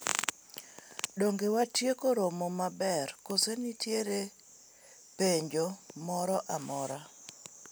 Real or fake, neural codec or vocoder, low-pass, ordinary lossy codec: real; none; none; none